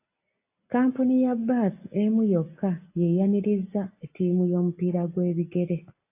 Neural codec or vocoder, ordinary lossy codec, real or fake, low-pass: none; AAC, 24 kbps; real; 3.6 kHz